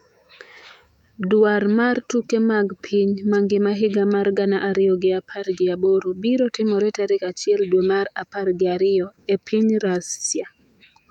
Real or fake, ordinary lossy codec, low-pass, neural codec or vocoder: fake; none; 19.8 kHz; autoencoder, 48 kHz, 128 numbers a frame, DAC-VAE, trained on Japanese speech